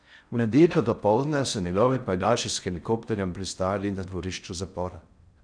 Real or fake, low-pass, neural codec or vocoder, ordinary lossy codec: fake; 9.9 kHz; codec, 16 kHz in and 24 kHz out, 0.6 kbps, FocalCodec, streaming, 4096 codes; none